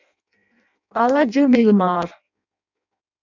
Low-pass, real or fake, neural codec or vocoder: 7.2 kHz; fake; codec, 16 kHz in and 24 kHz out, 0.6 kbps, FireRedTTS-2 codec